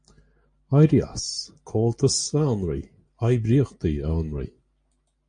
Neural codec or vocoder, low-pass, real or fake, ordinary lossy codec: none; 9.9 kHz; real; MP3, 48 kbps